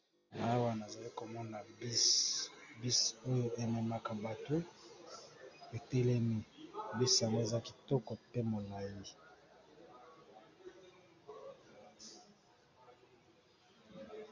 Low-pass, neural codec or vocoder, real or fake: 7.2 kHz; none; real